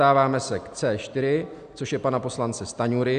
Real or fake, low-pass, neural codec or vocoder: real; 9.9 kHz; none